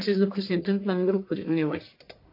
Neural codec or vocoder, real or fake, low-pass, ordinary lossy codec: codec, 44.1 kHz, 1.7 kbps, Pupu-Codec; fake; 5.4 kHz; MP3, 32 kbps